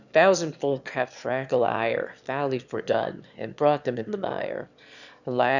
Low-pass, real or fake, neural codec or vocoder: 7.2 kHz; fake; autoencoder, 22.05 kHz, a latent of 192 numbers a frame, VITS, trained on one speaker